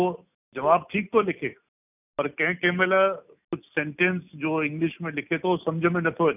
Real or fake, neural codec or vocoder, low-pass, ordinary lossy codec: real; none; 3.6 kHz; none